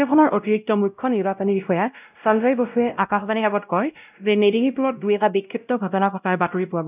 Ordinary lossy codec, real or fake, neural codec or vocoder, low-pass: none; fake; codec, 16 kHz, 0.5 kbps, X-Codec, WavLM features, trained on Multilingual LibriSpeech; 3.6 kHz